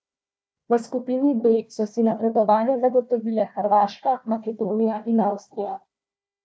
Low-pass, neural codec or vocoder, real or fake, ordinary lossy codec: none; codec, 16 kHz, 1 kbps, FunCodec, trained on Chinese and English, 50 frames a second; fake; none